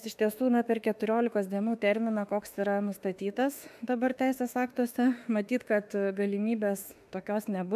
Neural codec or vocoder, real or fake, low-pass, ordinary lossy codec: autoencoder, 48 kHz, 32 numbers a frame, DAC-VAE, trained on Japanese speech; fake; 14.4 kHz; AAC, 96 kbps